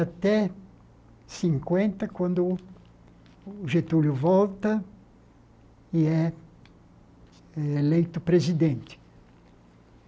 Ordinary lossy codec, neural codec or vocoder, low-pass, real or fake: none; none; none; real